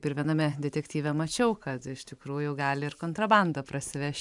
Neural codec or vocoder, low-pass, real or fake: vocoder, 44.1 kHz, 128 mel bands every 512 samples, BigVGAN v2; 10.8 kHz; fake